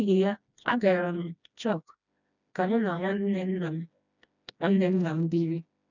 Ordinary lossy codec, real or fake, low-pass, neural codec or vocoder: none; fake; 7.2 kHz; codec, 16 kHz, 1 kbps, FreqCodec, smaller model